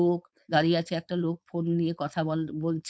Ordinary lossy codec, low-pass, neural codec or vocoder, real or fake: none; none; codec, 16 kHz, 4.8 kbps, FACodec; fake